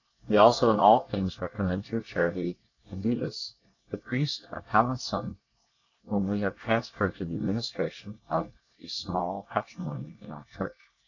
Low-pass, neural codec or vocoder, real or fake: 7.2 kHz; codec, 24 kHz, 1 kbps, SNAC; fake